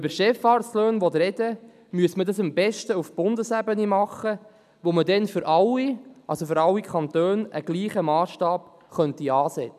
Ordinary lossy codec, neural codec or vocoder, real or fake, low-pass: none; none; real; 14.4 kHz